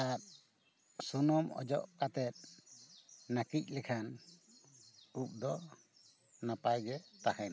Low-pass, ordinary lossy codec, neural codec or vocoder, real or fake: none; none; none; real